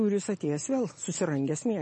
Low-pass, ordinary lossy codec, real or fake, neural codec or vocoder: 10.8 kHz; MP3, 32 kbps; real; none